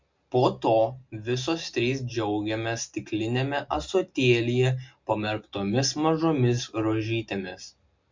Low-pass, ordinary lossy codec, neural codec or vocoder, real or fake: 7.2 kHz; MP3, 64 kbps; none; real